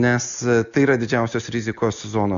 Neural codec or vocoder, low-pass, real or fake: none; 7.2 kHz; real